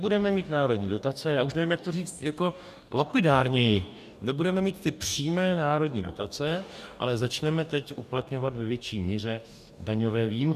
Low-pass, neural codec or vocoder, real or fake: 14.4 kHz; codec, 44.1 kHz, 2.6 kbps, DAC; fake